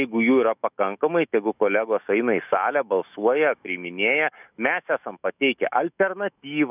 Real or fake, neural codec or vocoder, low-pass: fake; autoencoder, 48 kHz, 128 numbers a frame, DAC-VAE, trained on Japanese speech; 3.6 kHz